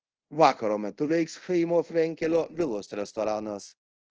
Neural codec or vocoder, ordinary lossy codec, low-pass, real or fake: codec, 24 kHz, 0.5 kbps, DualCodec; Opus, 16 kbps; 7.2 kHz; fake